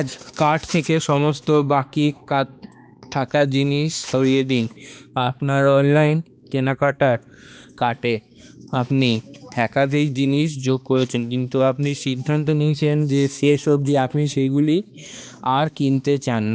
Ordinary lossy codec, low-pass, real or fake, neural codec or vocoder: none; none; fake; codec, 16 kHz, 2 kbps, X-Codec, HuBERT features, trained on balanced general audio